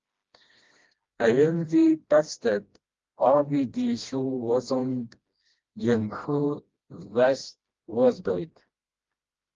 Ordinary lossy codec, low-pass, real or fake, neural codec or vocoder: Opus, 32 kbps; 7.2 kHz; fake; codec, 16 kHz, 1 kbps, FreqCodec, smaller model